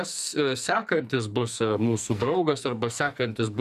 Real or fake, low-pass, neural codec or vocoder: fake; 14.4 kHz; codec, 44.1 kHz, 2.6 kbps, SNAC